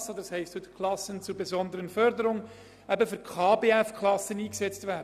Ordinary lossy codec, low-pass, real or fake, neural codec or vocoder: none; 14.4 kHz; real; none